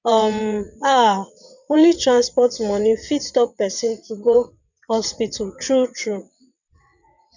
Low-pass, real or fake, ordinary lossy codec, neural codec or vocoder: 7.2 kHz; fake; none; vocoder, 22.05 kHz, 80 mel bands, WaveNeXt